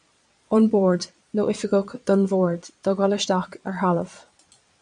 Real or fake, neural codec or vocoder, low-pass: fake; vocoder, 22.05 kHz, 80 mel bands, Vocos; 9.9 kHz